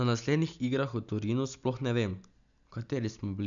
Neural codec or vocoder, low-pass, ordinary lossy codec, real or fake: none; 7.2 kHz; none; real